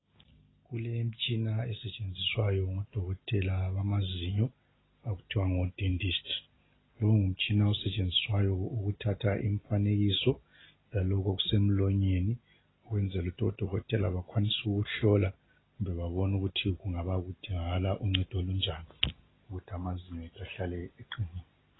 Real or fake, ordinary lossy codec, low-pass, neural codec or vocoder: real; AAC, 16 kbps; 7.2 kHz; none